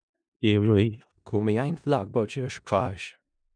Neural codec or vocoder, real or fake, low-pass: codec, 16 kHz in and 24 kHz out, 0.4 kbps, LongCat-Audio-Codec, four codebook decoder; fake; 9.9 kHz